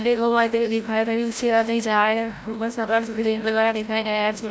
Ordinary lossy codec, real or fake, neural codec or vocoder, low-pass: none; fake; codec, 16 kHz, 0.5 kbps, FreqCodec, larger model; none